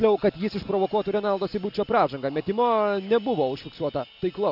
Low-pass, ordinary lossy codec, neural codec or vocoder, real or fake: 5.4 kHz; AAC, 48 kbps; none; real